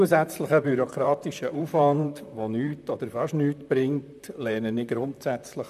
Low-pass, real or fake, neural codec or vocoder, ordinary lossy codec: 14.4 kHz; fake; vocoder, 44.1 kHz, 128 mel bands, Pupu-Vocoder; none